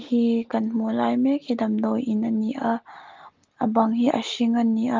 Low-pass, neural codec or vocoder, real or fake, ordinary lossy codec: 7.2 kHz; none; real; Opus, 32 kbps